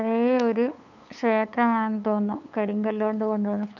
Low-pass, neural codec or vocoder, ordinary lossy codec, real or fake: 7.2 kHz; codec, 16 kHz, 8 kbps, FunCodec, trained on LibriTTS, 25 frames a second; none; fake